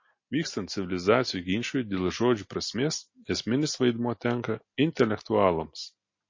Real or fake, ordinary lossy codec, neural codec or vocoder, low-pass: real; MP3, 32 kbps; none; 7.2 kHz